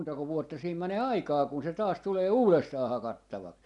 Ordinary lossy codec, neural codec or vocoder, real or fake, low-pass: none; none; real; 10.8 kHz